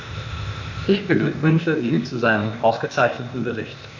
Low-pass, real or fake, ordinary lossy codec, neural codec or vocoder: 7.2 kHz; fake; none; codec, 16 kHz, 0.8 kbps, ZipCodec